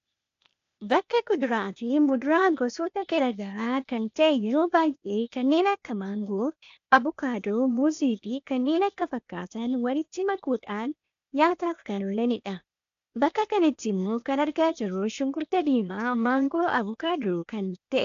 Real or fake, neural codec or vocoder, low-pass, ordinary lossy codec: fake; codec, 16 kHz, 0.8 kbps, ZipCodec; 7.2 kHz; AAC, 64 kbps